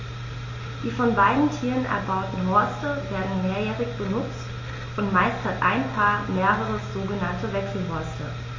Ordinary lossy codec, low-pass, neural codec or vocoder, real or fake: MP3, 32 kbps; 7.2 kHz; none; real